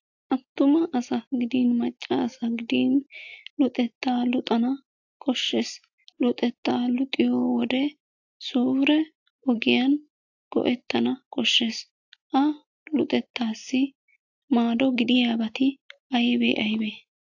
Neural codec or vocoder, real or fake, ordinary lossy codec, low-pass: none; real; AAC, 48 kbps; 7.2 kHz